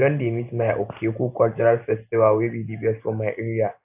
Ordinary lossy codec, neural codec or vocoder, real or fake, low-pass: AAC, 32 kbps; none; real; 3.6 kHz